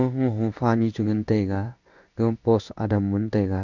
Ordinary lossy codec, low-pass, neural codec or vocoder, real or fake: none; 7.2 kHz; codec, 16 kHz in and 24 kHz out, 1 kbps, XY-Tokenizer; fake